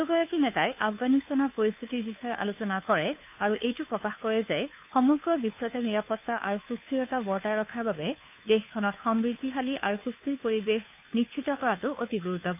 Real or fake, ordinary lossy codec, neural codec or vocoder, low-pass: fake; AAC, 32 kbps; codec, 16 kHz, 2 kbps, FunCodec, trained on Chinese and English, 25 frames a second; 3.6 kHz